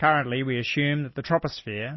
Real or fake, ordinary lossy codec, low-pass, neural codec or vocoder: real; MP3, 24 kbps; 7.2 kHz; none